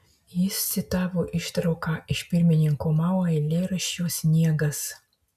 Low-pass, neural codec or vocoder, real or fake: 14.4 kHz; none; real